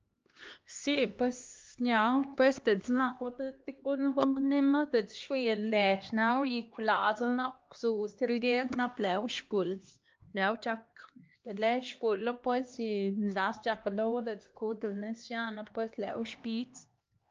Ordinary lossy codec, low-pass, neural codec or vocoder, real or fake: Opus, 24 kbps; 7.2 kHz; codec, 16 kHz, 2 kbps, X-Codec, HuBERT features, trained on LibriSpeech; fake